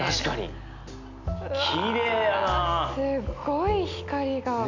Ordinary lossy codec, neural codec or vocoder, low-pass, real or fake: none; none; 7.2 kHz; real